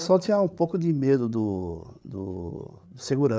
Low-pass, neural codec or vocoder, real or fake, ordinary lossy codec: none; codec, 16 kHz, 8 kbps, FreqCodec, larger model; fake; none